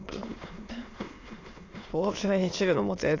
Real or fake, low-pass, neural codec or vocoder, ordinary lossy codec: fake; 7.2 kHz; autoencoder, 22.05 kHz, a latent of 192 numbers a frame, VITS, trained on many speakers; AAC, 32 kbps